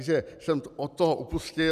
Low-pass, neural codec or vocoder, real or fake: 14.4 kHz; none; real